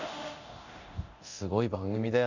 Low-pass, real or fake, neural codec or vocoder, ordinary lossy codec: 7.2 kHz; fake; codec, 24 kHz, 0.9 kbps, DualCodec; none